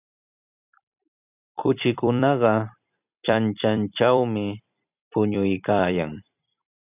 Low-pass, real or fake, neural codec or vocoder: 3.6 kHz; fake; vocoder, 44.1 kHz, 128 mel bands every 256 samples, BigVGAN v2